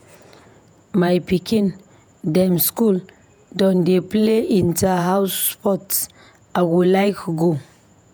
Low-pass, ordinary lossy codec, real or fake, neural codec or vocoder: none; none; fake; vocoder, 48 kHz, 128 mel bands, Vocos